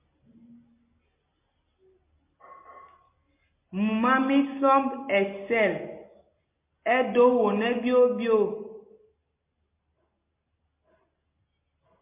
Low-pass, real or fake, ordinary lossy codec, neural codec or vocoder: 3.6 kHz; real; MP3, 24 kbps; none